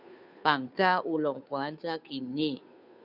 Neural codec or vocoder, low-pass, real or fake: codec, 16 kHz, 2 kbps, FunCodec, trained on Chinese and English, 25 frames a second; 5.4 kHz; fake